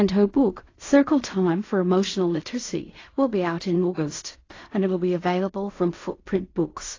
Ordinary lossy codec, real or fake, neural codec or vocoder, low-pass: AAC, 32 kbps; fake; codec, 16 kHz in and 24 kHz out, 0.4 kbps, LongCat-Audio-Codec, fine tuned four codebook decoder; 7.2 kHz